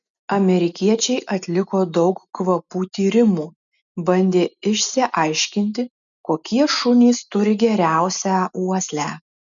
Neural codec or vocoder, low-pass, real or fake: none; 7.2 kHz; real